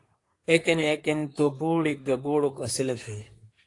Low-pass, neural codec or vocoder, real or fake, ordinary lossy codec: 10.8 kHz; codec, 24 kHz, 1 kbps, SNAC; fake; AAC, 48 kbps